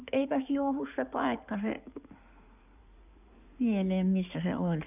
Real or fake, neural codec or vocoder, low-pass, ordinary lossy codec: fake; codec, 16 kHz in and 24 kHz out, 2.2 kbps, FireRedTTS-2 codec; 3.6 kHz; none